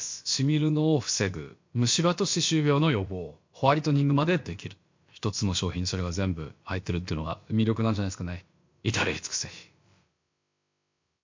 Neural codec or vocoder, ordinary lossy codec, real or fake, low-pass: codec, 16 kHz, about 1 kbps, DyCAST, with the encoder's durations; MP3, 48 kbps; fake; 7.2 kHz